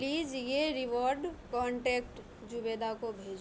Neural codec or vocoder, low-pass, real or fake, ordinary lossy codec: none; none; real; none